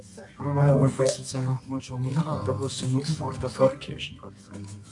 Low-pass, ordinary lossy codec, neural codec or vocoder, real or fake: 10.8 kHz; MP3, 64 kbps; codec, 24 kHz, 0.9 kbps, WavTokenizer, medium music audio release; fake